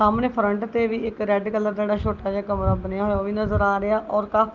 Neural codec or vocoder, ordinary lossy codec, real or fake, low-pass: none; Opus, 32 kbps; real; 7.2 kHz